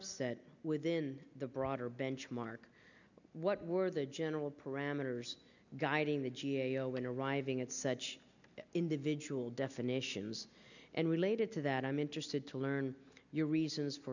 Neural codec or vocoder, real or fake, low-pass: none; real; 7.2 kHz